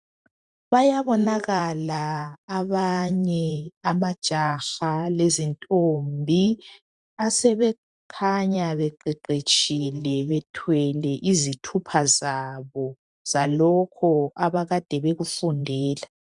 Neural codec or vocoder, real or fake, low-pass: vocoder, 44.1 kHz, 128 mel bands every 512 samples, BigVGAN v2; fake; 10.8 kHz